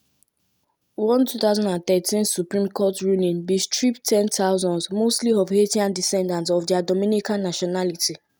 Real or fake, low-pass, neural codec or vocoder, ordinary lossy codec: real; none; none; none